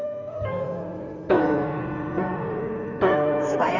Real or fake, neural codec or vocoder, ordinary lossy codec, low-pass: fake; codec, 16 kHz in and 24 kHz out, 2.2 kbps, FireRedTTS-2 codec; none; 7.2 kHz